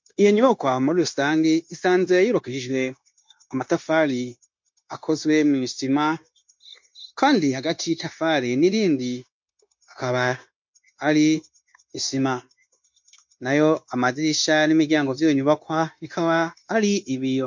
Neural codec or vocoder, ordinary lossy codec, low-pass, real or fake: codec, 16 kHz, 0.9 kbps, LongCat-Audio-Codec; MP3, 48 kbps; 7.2 kHz; fake